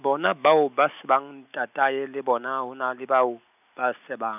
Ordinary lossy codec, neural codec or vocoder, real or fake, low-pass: none; none; real; 3.6 kHz